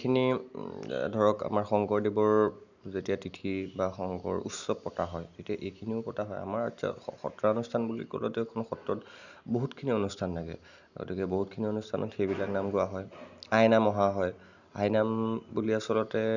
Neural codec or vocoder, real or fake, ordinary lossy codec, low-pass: none; real; none; 7.2 kHz